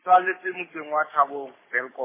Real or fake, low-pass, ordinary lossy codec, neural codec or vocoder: fake; 3.6 kHz; MP3, 16 kbps; codec, 44.1 kHz, 7.8 kbps, Pupu-Codec